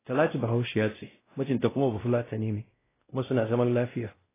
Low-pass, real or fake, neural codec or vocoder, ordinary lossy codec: 3.6 kHz; fake; codec, 16 kHz, 0.5 kbps, X-Codec, WavLM features, trained on Multilingual LibriSpeech; AAC, 16 kbps